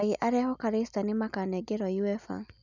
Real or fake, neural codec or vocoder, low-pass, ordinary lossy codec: real; none; 7.2 kHz; none